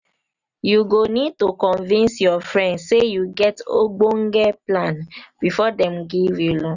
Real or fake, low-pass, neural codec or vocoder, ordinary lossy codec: real; 7.2 kHz; none; none